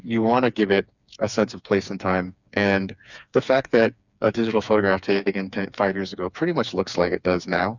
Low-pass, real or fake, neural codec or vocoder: 7.2 kHz; fake; codec, 16 kHz, 4 kbps, FreqCodec, smaller model